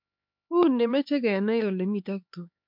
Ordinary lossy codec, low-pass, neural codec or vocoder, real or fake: MP3, 48 kbps; 5.4 kHz; codec, 16 kHz, 4 kbps, X-Codec, HuBERT features, trained on LibriSpeech; fake